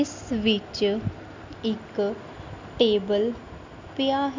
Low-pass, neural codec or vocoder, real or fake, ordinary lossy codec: 7.2 kHz; none; real; MP3, 64 kbps